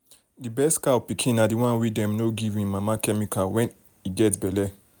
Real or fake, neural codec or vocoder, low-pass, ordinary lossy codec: real; none; none; none